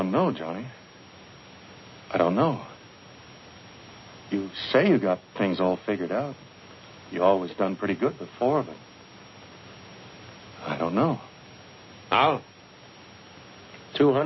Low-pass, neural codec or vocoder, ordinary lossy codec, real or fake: 7.2 kHz; none; MP3, 24 kbps; real